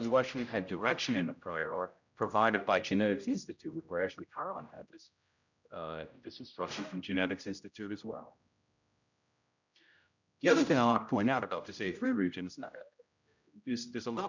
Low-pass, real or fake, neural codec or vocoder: 7.2 kHz; fake; codec, 16 kHz, 0.5 kbps, X-Codec, HuBERT features, trained on general audio